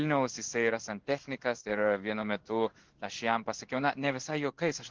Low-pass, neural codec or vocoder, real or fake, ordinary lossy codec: 7.2 kHz; codec, 16 kHz in and 24 kHz out, 1 kbps, XY-Tokenizer; fake; Opus, 16 kbps